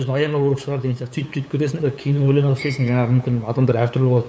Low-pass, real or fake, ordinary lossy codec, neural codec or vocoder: none; fake; none; codec, 16 kHz, 8 kbps, FunCodec, trained on LibriTTS, 25 frames a second